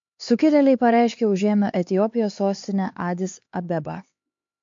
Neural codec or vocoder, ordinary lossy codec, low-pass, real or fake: codec, 16 kHz, 4 kbps, X-Codec, HuBERT features, trained on LibriSpeech; MP3, 48 kbps; 7.2 kHz; fake